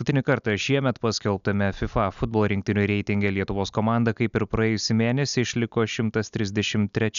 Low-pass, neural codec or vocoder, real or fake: 7.2 kHz; none; real